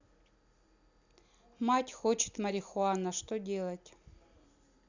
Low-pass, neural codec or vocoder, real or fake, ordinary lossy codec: 7.2 kHz; none; real; Opus, 64 kbps